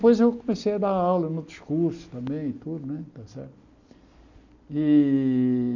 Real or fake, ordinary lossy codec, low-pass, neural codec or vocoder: real; none; 7.2 kHz; none